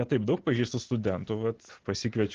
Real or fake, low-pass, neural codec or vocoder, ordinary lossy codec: real; 7.2 kHz; none; Opus, 16 kbps